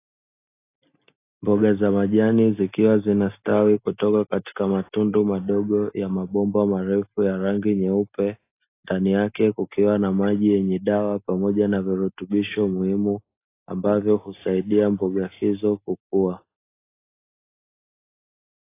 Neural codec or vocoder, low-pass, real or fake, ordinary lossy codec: none; 3.6 kHz; real; AAC, 24 kbps